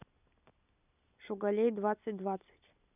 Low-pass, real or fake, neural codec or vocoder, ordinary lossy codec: 3.6 kHz; real; none; none